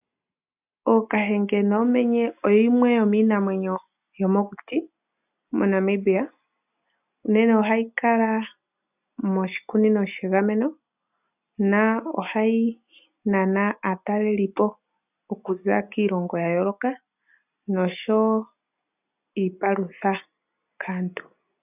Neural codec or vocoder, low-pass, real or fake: none; 3.6 kHz; real